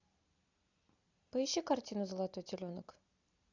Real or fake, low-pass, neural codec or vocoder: real; 7.2 kHz; none